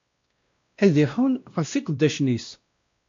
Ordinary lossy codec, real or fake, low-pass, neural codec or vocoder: MP3, 48 kbps; fake; 7.2 kHz; codec, 16 kHz, 1 kbps, X-Codec, WavLM features, trained on Multilingual LibriSpeech